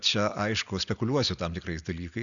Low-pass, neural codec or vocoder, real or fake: 7.2 kHz; none; real